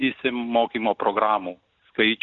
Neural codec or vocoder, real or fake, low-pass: none; real; 7.2 kHz